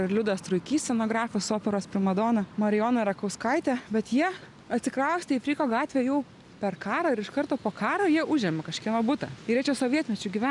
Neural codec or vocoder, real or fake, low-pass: none; real; 10.8 kHz